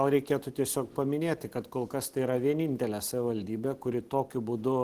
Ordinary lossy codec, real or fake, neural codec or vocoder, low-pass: Opus, 16 kbps; real; none; 14.4 kHz